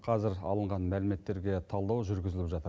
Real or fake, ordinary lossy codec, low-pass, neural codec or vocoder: real; none; none; none